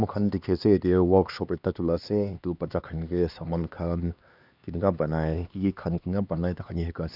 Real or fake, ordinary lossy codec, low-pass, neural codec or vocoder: fake; none; 5.4 kHz; codec, 16 kHz, 2 kbps, X-Codec, HuBERT features, trained on LibriSpeech